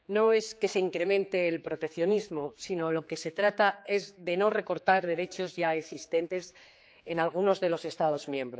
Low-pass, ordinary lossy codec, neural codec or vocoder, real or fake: none; none; codec, 16 kHz, 4 kbps, X-Codec, HuBERT features, trained on general audio; fake